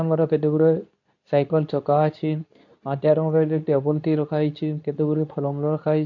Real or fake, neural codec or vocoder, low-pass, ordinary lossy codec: fake; codec, 24 kHz, 0.9 kbps, WavTokenizer, medium speech release version 2; 7.2 kHz; none